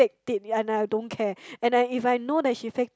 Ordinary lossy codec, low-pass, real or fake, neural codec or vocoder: none; none; real; none